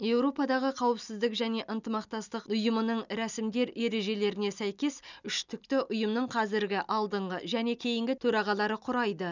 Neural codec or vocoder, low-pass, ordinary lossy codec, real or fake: none; 7.2 kHz; none; real